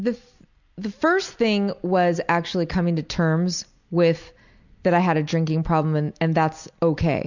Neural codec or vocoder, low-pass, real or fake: none; 7.2 kHz; real